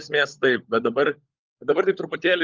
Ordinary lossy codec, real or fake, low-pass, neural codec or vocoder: Opus, 24 kbps; fake; 7.2 kHz; codec, 16 kHz, 16 kbps, FunCodec, trained on LibriTTS, 50 frames a second